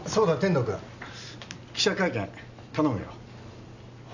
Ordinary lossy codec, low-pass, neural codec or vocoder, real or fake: none; 7.2 kHz; none; real